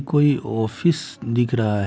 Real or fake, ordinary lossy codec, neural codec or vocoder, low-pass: real; none; none; none